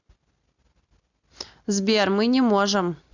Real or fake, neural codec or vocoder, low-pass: real; none; 7.2 kHz